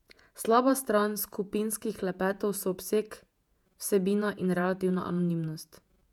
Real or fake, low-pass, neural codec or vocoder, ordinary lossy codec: fake; 19.8 kHz; vocoder, 48 kHz, 128 mel bands, Vocos; none